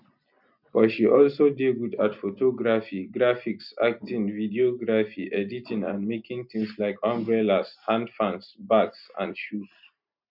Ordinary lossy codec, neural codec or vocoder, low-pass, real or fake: none; none; 5.4 kHz; real